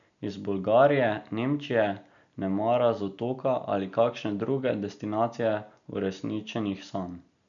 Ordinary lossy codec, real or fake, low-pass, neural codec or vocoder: none; real; 7.2 kHz; none